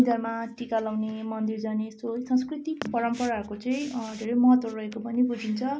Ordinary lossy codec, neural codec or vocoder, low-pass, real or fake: none; none; none; real